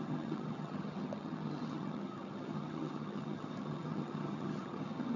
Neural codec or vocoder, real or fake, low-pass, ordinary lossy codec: vocoder, 22.05 kHz, 80 mel bands, HiFi-GAN; fake; 7.2 kHz; none